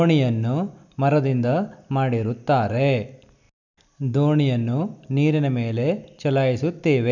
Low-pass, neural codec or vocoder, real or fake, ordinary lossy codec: 7.2 kHz; none; real; none